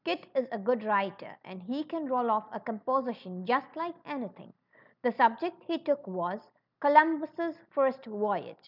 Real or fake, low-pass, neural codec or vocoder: real; 5.4 kHz; none